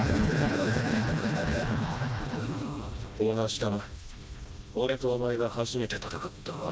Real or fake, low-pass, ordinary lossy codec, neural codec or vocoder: fake; none; none; codec, 16 kHz, 1 kbps, FreqCodec, smaller model